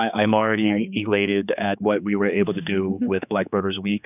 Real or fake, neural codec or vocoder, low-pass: fake; codec, 16 kHz, 4 kbps, X-Codec, HuBERT features, trained on general audio; 3.6 kHz